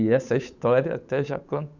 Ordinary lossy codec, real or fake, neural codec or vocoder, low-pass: none; fake; codec, 16 kHz, 8 kbps, FunCodec, trained on Chinese and English, 25 frames a second; 7.2 kHz